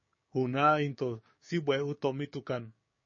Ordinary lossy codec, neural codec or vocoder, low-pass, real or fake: MP3, 32 kbps; codec, 16 kHz, 6 kbps, DAC; 7.2 kHz; fake